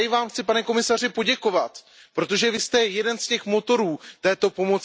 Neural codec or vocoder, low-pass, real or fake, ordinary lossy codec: none; none; real; none